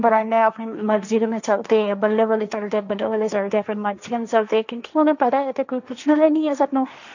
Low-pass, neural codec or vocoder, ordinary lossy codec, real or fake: 7.2 kHz; codec, 16 kHz, 1.1 kbps, Voila-Tokenizer; none; fake